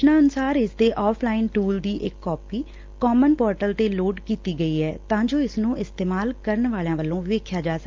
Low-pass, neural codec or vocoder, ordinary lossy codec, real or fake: 7.2 kHz; none; Opus, 24 kbps; real